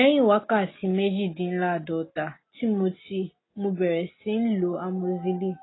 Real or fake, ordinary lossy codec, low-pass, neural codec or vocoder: real; AAC, 16 kbps; 7.2 kHz; none